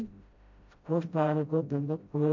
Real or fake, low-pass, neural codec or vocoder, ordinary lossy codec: fake; 7.2 kHz; codec, 16 kHz, 0.5 kbps, FreqCodec, smaller model; MP3, 48 kbps